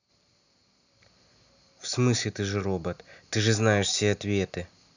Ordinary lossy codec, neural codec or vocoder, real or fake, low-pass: none; none; real; 7.2 kHz